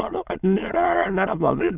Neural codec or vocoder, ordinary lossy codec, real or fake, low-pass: autoencoder, 22.05 kHz, a latent of 192 numbers a frame, VITS, trained on many speakers; Opus, 64 kbps; fake; 3.6 kHz